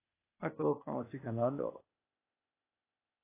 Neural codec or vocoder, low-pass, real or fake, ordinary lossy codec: codec, 16 kHz, 0.8 kbps, ZipCodec; 3.6 kHz; fake; AAC, 24 kbps